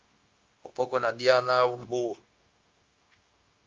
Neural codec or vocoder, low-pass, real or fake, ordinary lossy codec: codec, 16 kHz, 0.9 kbps, LongCat-Audio-Codec; 7.2 kHz; fake; Opus, 32 kbps